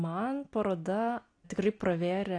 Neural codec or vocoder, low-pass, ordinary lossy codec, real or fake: none; 9.9 kHz; AAC, 48 kbps; real